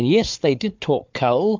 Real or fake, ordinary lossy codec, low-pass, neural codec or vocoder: fake; MP3, 64 kbps; 7.2 kHz; codec, 16 kHz, 4 kbps, FunCodec, trained on Chinese and English, 50 frames a second